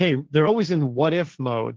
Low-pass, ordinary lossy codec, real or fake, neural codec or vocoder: 7.2 kHz; Opus, 16 kbps; fake; codec, 16 kHz, 1.1 kbps, Voila-Tokenizer